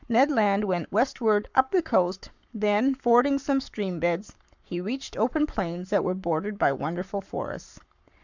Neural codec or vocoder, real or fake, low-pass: codec, 44.1 kHz, 7.8 kbps, Pupu-Codec; fake; 7.2 kHz